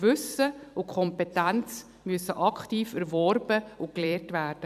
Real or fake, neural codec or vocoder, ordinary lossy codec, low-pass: fake; vocoder, 44.1 kHz, 128 mel bands every 256 samples, BigVGAN v2; none; 14.4 kHz